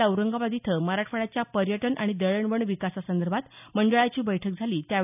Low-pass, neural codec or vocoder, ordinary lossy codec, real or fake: 3.6 kHz; none; none; real